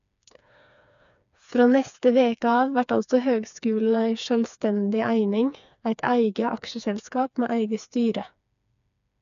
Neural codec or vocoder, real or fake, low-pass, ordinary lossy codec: codec, 16 kHz, 4 kbps, FreqCodec, smaller model; fake; 7.2 kHz; none